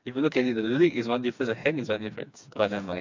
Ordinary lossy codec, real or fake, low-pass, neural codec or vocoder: MP3, 64 kbps; fake; 7.2 kHz; codec, 16 kHz, 2 kbps, FreqCodec, smaller model